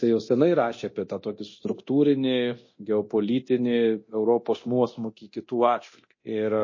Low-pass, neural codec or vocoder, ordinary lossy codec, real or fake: 7.2 kHz; codec, 24 kHz, 0.9 kbps, DualCodec; MP3, 32 kbps; fake